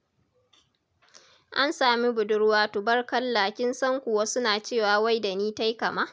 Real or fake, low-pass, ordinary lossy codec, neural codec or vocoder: real; none; none; none